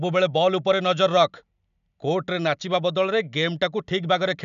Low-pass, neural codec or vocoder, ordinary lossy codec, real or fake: 7.2 kHz; none; none; real